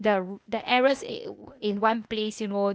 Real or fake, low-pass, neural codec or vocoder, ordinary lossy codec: fake; none; codec, 16 kHz, 0.8 kbps, ZipCodec; none